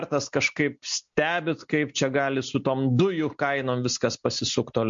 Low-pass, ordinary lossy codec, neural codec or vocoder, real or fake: 7.2 kHz; MP3, 48 kbps; none; real